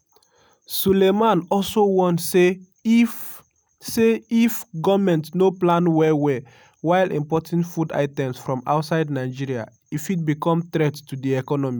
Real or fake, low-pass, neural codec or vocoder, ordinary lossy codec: real; none; none; none